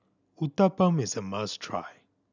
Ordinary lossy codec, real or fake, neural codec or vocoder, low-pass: none; real; none; 7.2 kHz